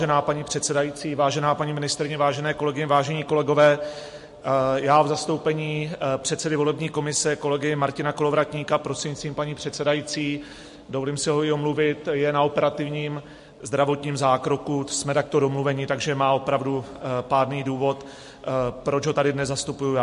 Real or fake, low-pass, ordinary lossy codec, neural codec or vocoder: real; 14.4 kHz; MP3, 48 kbps; none